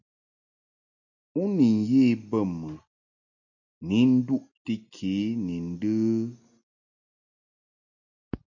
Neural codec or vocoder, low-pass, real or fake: none; 7.2 kHz; real